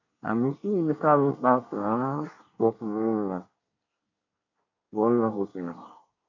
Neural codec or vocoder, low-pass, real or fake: codec, 24 kHz, 1 kbps, SNAC; 7.2 kHz; fake